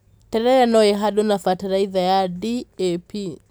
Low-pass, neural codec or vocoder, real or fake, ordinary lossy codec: none; none; real; none